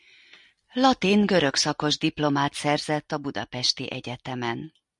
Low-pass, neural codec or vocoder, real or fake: 10.8 kHz; none; real